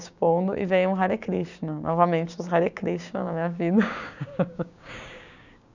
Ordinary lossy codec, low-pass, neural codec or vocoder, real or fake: none; 7.2 kHz; codec, 16 kHz, 6 kbps, DAC; fake